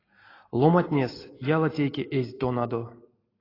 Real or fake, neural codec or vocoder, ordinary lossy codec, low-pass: real; none; AAC, 24 kbps; 5.4 kHz